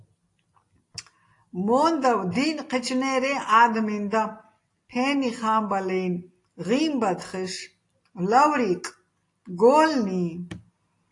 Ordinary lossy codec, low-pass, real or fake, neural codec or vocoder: AAC, 48 kbps; 10.8 kHz; real; none